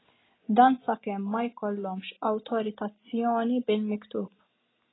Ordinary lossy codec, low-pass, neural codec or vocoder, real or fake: AAC, 16 kbps; 7.2 kHz; none; real